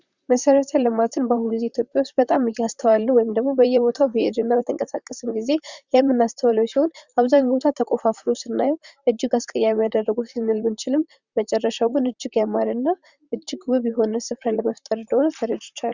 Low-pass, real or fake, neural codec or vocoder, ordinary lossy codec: 7.2 kHz; fake; vocoder, 44.1 kHz, 128 mel bands, Pupu-Vocoder; Opus, 64 kbps